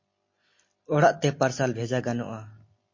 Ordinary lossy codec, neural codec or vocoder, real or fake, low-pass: MP3, 32 kbps; none; real; 7.2 kHz